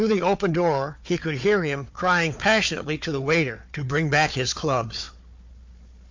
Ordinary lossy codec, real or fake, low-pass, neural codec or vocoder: MP3, 48 kbps; fake; 7.2 kHz; codec, 16 kHz, 4 kbps, FunCodec, trained on Chinese and English, 50 frames a second